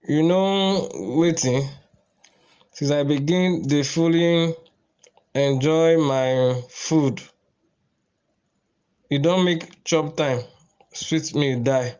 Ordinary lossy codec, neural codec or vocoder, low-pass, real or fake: Opus, 24 kbps; none; 7.2 kHz; real